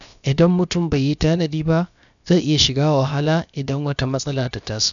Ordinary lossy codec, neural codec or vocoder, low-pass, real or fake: none; codec, 16 kHz, about 1 kbps, DyCAST, with the encoder's durations; 7.2 kHz; fake